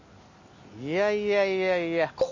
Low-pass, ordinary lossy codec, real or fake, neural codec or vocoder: 7.2 kHz; MP3, 32 kbps; real; none